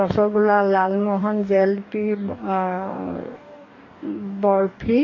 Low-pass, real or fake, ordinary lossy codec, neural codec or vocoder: 7.2 kHz; fake; AAC, 32 kbps; codec, 44.1 kHz, 2.6 kbps, DAC